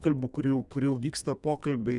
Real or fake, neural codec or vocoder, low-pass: fake; codec, 32 kHz, 1.9 kbps, SNAC; 10.8 kHz